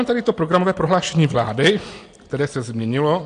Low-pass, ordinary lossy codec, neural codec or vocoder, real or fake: 9.9 kHz; AAC, 48 kbps; none; real